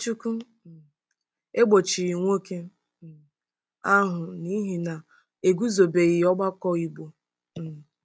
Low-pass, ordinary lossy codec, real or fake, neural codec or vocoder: none; none; real; none